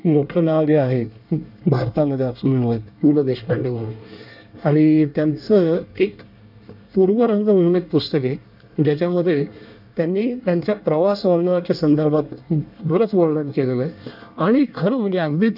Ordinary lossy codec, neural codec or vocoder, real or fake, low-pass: none; codec, 24 kHz, 1 kbps, SNAC; fake; 5.4 kHz